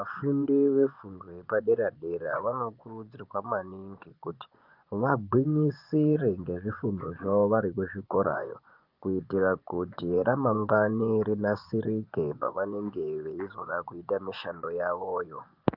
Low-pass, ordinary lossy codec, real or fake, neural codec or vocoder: 5.4 kHz; Opus, 24 kbps; fake; vocoder, 24 kHz, 100 mel bands, Vocos